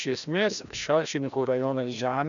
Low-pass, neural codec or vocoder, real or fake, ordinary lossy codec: 7.2 kHz; codec, 16 kHz, 1 kbps, FreqCodec, larger model; fake; MP3, 96 kbps